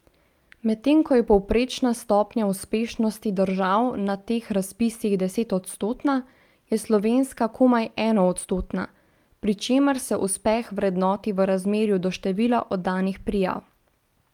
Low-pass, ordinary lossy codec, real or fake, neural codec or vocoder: 19.8 kHz; Opus, 32 kbps; real; none